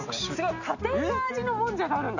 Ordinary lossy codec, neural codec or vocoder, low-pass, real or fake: none; none; 7.2 kHz; real